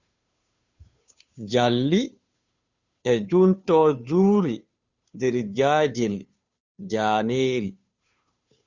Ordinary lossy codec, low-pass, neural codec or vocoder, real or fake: Opus, 64 kbps; 7.2 kHz; codec, 16 kHz, 2 kbps, FunCodec, trained on Chinese and English, 25 frames a second; fake